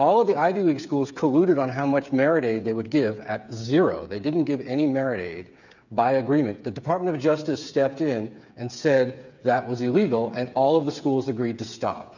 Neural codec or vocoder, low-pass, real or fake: codec, 16 kHz, 8 kbps, FreqCodec, smaller model; 7.2 kHz; fake